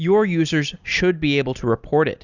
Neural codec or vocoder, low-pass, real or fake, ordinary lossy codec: none; 7.2 kHz; real; Opus, 64 kbps